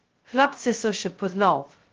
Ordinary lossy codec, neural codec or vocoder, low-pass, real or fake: Opus, 16 kbps; codec, 16 kHz, 0.2 kbps, FocalCodec; 7.2 kHz; fake